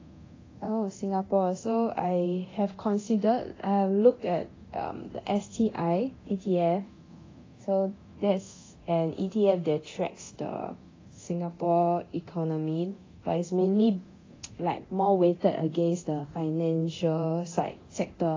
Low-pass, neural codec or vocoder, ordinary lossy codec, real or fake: 7.2 kHz; codec, 24 kHz, 0.9 kbps, DualCodec; AAC, 32 kbps; fake